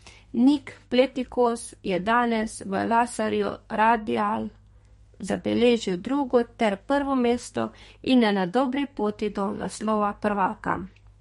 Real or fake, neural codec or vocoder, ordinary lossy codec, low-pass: fake; codec, 32 kHz, 1.9 kbps, SNAC; MP3, 48 kbps; 14.4 kHz